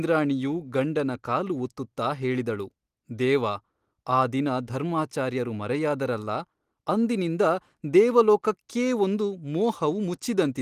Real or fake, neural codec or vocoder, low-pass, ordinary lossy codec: real; none; 14.4 kHz; Opus, 24 kbps